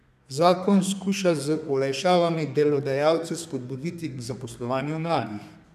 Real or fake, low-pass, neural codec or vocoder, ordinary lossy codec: fake; 14.4 kHz; codec, 32 kHz, 1.9 kbps, SNAC; none